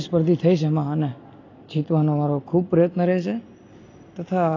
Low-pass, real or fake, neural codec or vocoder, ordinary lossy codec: 7.2 kHz; real; none; AAC, 32 kbps